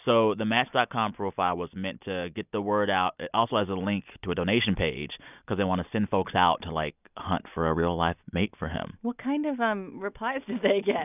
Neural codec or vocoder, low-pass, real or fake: none; 3.6 kHz; real